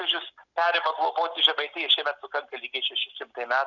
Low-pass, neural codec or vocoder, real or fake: 7.2 kHz; none; real